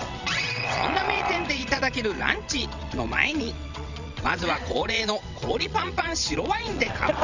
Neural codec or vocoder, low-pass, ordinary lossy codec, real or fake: vocoder, 22.05 kHz, 80 mel bands, WaveNeXt; 7.2 kHz; none; fake